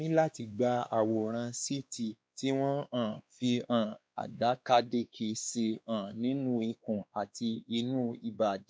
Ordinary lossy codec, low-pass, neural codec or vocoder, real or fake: none; none; codec, 16 kHz, 2 kbps, X-Codec, WavLM features, trained on Multilingual LibriSpeech; fake